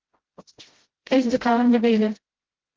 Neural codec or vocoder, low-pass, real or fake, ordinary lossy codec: codec, 16 kHz, 0.5 kbps, FreqCodec, smaller model; 7.2 kHz; fake; Opus, 16 kbps